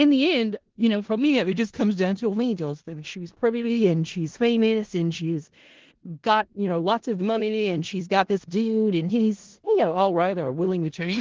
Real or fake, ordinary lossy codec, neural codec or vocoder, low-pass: fake; Opus, 16 kbps; codec, 16 kHz in and 24 kHz out, 0.4 kbps, LongCat-Audio-Codec, four codebook decoder; 7.2 kHz